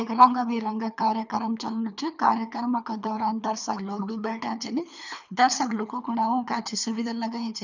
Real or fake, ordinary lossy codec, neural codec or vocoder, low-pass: fake; none; codec, 24 kHz, 3 kbps, HILCodec; 7.2 kHz